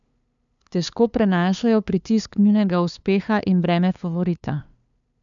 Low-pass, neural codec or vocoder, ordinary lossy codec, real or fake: 7.2 kHz; codec, 16 kHz, 2 kbps, FunCodec, trained on LibriTTS, 25 frames a second; none; fake